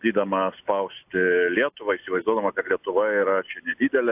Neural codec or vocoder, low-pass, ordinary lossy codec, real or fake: none; 3.6 kHz; AAC, 32 kbps; real